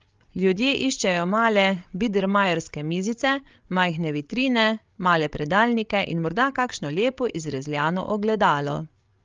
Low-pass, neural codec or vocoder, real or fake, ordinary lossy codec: 7.2 kHz; codec, 16 kHz, 8 kbps, FreqCodec, larger model; fake; Opus, 32 kbps